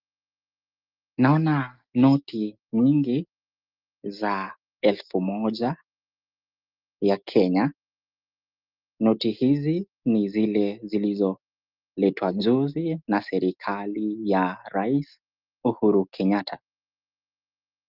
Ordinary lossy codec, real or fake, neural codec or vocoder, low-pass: Opus, 32 kbps; real; none; 5.4 kHz